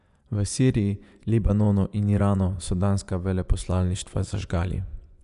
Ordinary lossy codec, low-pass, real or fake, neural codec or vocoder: none; 10.8 kHz; fake; vocoder, 24 kHz, 100 mel bands, Vocos